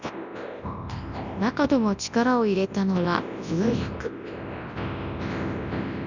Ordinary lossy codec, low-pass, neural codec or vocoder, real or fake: Opus, 64 kbps; 7.2 kHz; codec, 24 kHz, 0.9 kbps, WavTokenizer, large speech release; fake